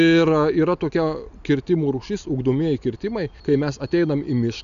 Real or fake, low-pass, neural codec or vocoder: real; 7.2 kHz; none